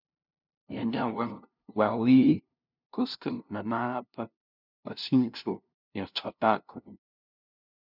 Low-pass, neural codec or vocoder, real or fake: 5.4 kHz; codec, 16 kHz, 0.5 kbps, FunCodec, trained on LibriTTS, 25 frames a second; fake